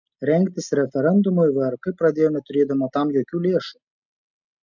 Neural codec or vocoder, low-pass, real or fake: none; 7.2 kHz; real